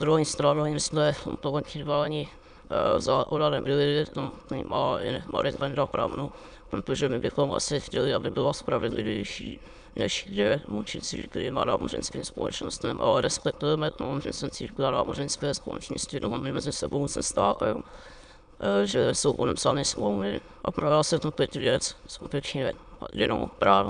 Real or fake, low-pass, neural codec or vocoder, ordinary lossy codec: fake; 9.9 kHz; autoencoder, 22.05 kHz, a latent of 192 numbers a frame, VITS, trained on many speakers; MP3, 96 kbps